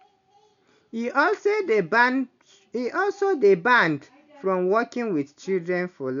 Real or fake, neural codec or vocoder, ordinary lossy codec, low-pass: real; none; none; 7.2 kHz